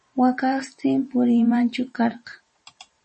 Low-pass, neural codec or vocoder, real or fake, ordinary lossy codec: 10.8 kHz; vocoder, 44.1 kHz, 128 mel bands every 512 samples, BigVGAN v2; fake; MP3, 32 kbps